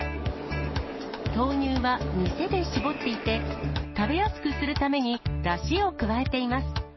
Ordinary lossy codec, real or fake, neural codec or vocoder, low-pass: MP3, 24 kbps; fake; codec, 44.1 kHz, 7.8 kbps, DAC; 7.2 kHz